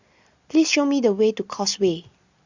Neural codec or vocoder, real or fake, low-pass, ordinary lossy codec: none; real; 7.2 kHz; Opus, 64 kbps